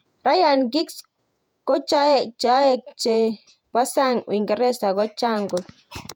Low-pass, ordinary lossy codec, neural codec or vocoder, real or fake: 19.8 kHz; MP3, 96 kbps; vocoder, 48 kHz, 128 mel bands, Vocos; fake